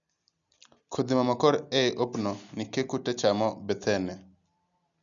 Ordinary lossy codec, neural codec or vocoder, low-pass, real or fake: none; none; 7.2 kHz; real